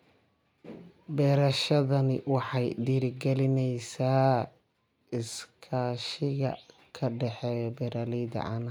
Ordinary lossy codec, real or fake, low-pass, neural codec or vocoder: none; real; 19.8 kHz; none